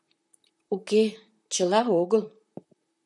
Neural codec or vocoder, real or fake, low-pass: vocoder, 44.1 kHz, 128 mel bands, Pupu-Vocoder; fake; 10.8 kHz